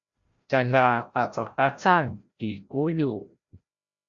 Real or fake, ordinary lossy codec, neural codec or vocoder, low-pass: fake; Opus, 64 kbps; codec, 16 kHz, 0.5 kbps, FreqCodec, larger model; 7.2 kHz